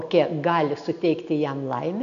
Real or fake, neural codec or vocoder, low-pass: real; none; 7.2 kHz